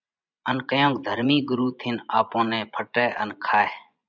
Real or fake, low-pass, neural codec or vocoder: fake; 7.2 kHz; vocoder, 24 kHz, 100 mel bands, Vocos